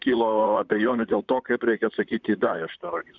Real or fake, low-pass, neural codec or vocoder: fake; 7.2 kHz; vocoder, 44.1 kHz, 128 mel bands, Pupu-Vocoder